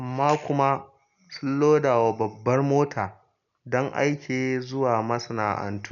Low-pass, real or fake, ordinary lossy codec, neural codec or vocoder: 7.2 kHz; real; none; none